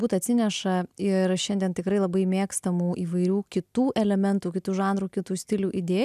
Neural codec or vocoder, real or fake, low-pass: none; real; 14.4 kHz